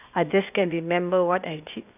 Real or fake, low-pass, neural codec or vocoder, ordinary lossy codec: fake; 3.6 kHz; codec, 16 kHz, 0.8 kbps, ZipCodec; none